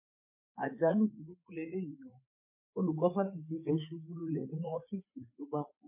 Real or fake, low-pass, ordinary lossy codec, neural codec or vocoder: fake; 3.6 kHz; MP3, 24 kbps; codec, 16 kHz, 4 kbps, FreqCodec, larger model